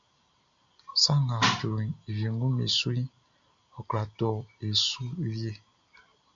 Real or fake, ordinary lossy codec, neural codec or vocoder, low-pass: real; MP3, 64 kbps; none; 7.2 kHz